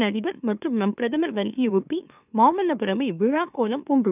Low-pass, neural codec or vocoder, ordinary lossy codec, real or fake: 3.6 kHz; autoencoder, 44.1 kHz, a latent of 192 numbers a frame, MeloTTS; none; fake